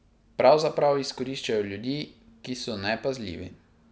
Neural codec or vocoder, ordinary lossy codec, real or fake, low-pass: none; none; real; none